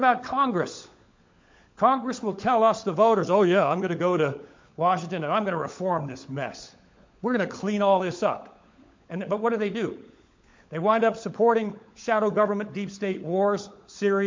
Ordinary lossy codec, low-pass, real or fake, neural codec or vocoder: MP3, 48 kbps; 7.2 kHz; fake; codec, 16 kHz, 16 kbps, FunCodec, trained on LibriTTS, 50 frames a second